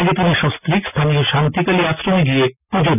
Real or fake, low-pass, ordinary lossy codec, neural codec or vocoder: real; 3.6 kHz; none; none